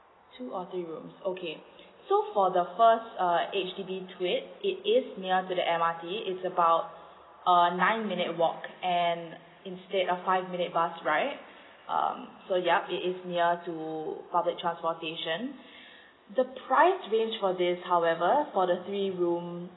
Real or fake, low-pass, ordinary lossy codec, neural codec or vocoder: real; 7.2 kHz; AAC, 16 kbps; none